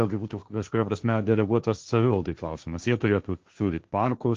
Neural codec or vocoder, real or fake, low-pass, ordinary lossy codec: codec, 16 kHz, 1.1 kbps, Voila-Tokenizer; fake; 7.2 kHz; Opus, 24 kbps